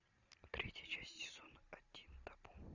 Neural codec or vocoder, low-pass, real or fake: none; 7.2 kHz; real